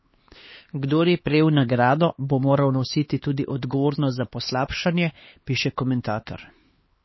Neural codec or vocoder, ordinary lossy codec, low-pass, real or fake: codec, 16 kHz, 4 kbps, X-Codec, HuBERT features, trained on LibriSpeech; MP3, 24 kbps; 7.2 kHz; fake